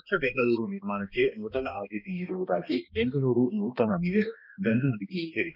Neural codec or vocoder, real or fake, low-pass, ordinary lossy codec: codec, 16 kHz, 1 kbps, X-Codec, HuBERT features, trained on balanced general audio; fake; 5.4 kHz; none